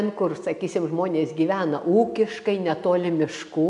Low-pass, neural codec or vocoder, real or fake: 10.8 kHz; none; real